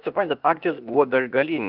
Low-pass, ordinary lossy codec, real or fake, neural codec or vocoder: 5.4 kHz; Opus, 24 kbps; fake; codec, 16 kHz, 0.8 kbps, ZipCodec